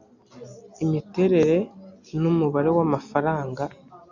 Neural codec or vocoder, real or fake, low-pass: none; real; 7.2 kHz